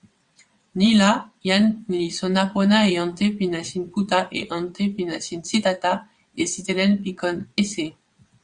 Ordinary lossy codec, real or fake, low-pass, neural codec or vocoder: Opus, 64 kbps; fake; 9.9 kHz; vocoder, 22.05 kHz, 80 mel bands, WaveNeXt